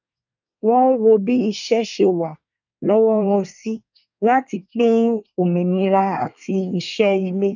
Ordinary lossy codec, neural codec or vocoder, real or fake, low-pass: none; codec, 24 kHz, 1 kbps, SNAC; fake; 7.2 kHz